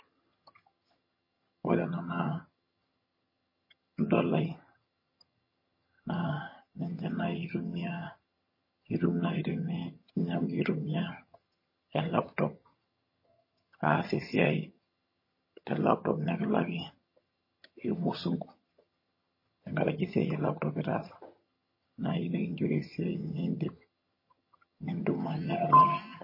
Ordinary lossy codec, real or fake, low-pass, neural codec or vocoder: MP3, 24 kbps; fake; 5.4 kHz; vocoder, 22.05 kHz, 80 mel bands, HiFi-GAN